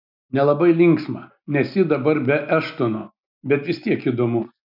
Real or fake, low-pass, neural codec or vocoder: real; 5.4 kHz; none